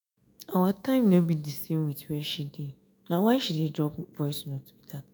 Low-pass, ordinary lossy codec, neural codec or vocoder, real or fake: none; none; autoencoder, 48 kHz, 128 numbers a frame, DAC-VAE, trained on Japanese speech; fake